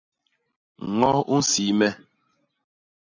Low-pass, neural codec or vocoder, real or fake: 7.2 kHz; none; real